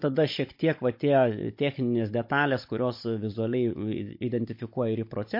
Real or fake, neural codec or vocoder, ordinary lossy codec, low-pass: fake; codec, 16 kHz, 16 kbps, FunCodec, trained on Chinese and English, 50 frames a second; MP3, 32 kbps; 5.4 kHz